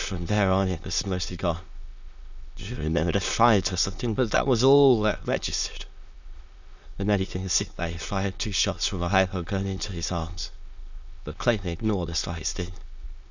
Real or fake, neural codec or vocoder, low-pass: fake; autoencoder, 22.05 kHz, a latent of 192 numbers a frame, VITS, trained on many speakers; 7.2 kHz